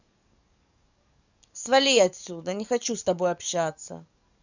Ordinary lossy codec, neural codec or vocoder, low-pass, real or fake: none; codec, 44.1 kHz, 7.8 kbps, DAC; 7.2 kHz; fake